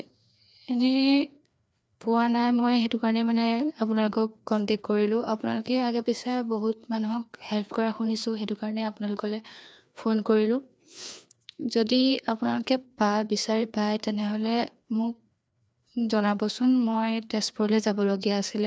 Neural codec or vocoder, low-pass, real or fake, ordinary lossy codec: codec, 16 kHz, 2 kbps, FreqCodec, larger model; none; fake; none